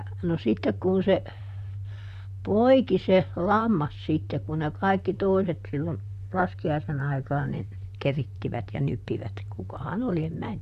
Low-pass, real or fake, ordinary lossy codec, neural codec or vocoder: 14.4 kHz; fake; AAC, 96 kbps; vocoder, 44.1 kHz, 128 mel bands, Pupu-Vocoder